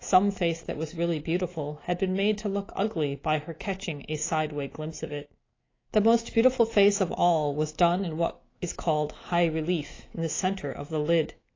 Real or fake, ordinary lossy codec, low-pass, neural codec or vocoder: fake; AAC, 32 kbps; 7.2 kHz; autoencoder, 48 kHz, 128 numbers a frame, DAC-VAE, trained on Japanese speech